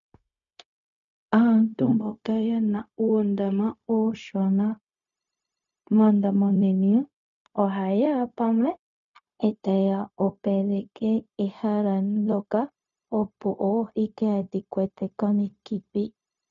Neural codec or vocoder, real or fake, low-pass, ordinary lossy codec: codec, 16 kHz, 0.4 kbps, LongCat-Audio-Codec; fake; 7.2 kHz; MP3, 96 kbps